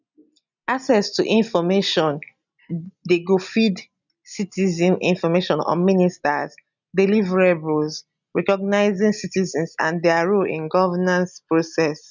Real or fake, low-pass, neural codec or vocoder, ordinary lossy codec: real; 7.2 kHz; none; none